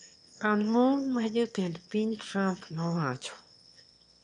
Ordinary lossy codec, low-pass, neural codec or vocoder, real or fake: none; 9.9 kHz; autoencoder, 22.05 kHz, a latent of 192 numbers a frame, VITS, trained on one speaker; fake